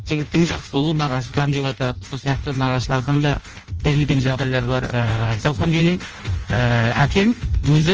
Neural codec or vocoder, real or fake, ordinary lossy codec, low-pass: codec, 16 kHz in and 24 kHz out, 0.6 kbps, FireRedTTS-2 codec; fake; Opus, 24 kbps; 7.2 kHz